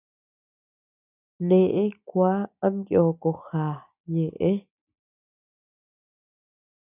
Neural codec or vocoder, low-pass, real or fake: none; 3.6 kHz; real